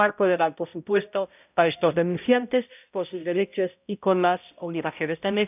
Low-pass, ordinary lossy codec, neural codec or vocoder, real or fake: 3.6 kHz; none; codec, 16 kHz, 0.5 kbps, X-Codec, HuBERT features, trained on balanced general audio; fake